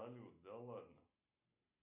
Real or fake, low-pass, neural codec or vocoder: real; 3.6 kHz; none